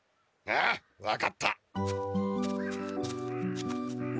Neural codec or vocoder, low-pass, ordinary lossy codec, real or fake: none; none; none; real